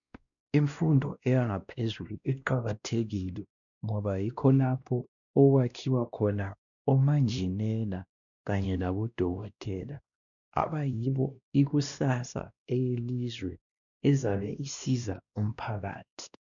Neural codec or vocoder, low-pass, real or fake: codec, 16 kHz, 1 kbps, X-Codec, WavLM features, trained on Multilingual LibriSpeech; 7.2 kHz; fake